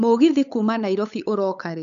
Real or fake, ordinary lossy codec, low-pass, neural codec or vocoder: fake; none; 7.2 kHz; codec, 16 kHz, 8 kbps, FunCodec, trained on LibriTTS, 25 frames a second